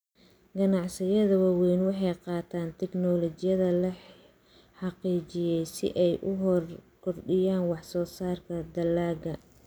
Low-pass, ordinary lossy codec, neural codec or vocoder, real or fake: none; none; none; real